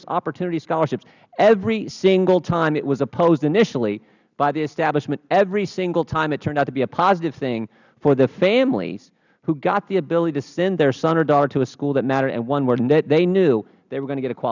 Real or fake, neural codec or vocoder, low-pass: real; none; 7.2 kHz